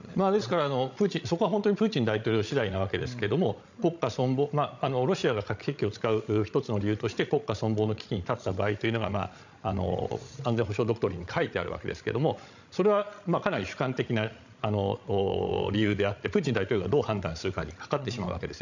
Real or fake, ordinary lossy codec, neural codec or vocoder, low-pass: fake; none; codec, 16 kHz, 16 kbps, FreqCodec, larger model; 7.2 kHz